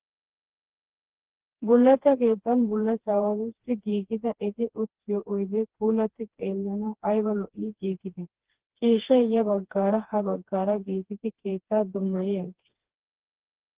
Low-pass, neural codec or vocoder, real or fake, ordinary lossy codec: 3.6 kHz; codec, 16 kHz, 2 kbps, FreqCodec, smaller model; fake; Opus, 16 kbps